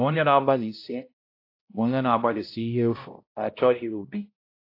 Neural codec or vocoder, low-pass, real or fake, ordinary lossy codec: codec, 16 kHz, 0.5 kbps, X-Codec, HuBERT features, trained on balanced general audio; 5.4 kHz; fake; AAC, 32 kbps